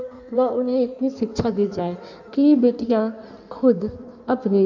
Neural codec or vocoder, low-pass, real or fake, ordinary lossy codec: codec, 16 kHz in and 24 kHz out, 1.1 kbps, FireRedTTS-2 codec; 7.2 kHz; fake; none